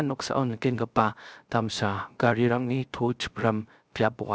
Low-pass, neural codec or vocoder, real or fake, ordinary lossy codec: none; codec, 16 kHz, 0.3 kbps, FocalCodec; fake; none